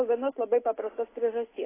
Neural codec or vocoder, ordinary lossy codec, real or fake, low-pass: none; AAC, 16 kbps; real; 3.6 kHz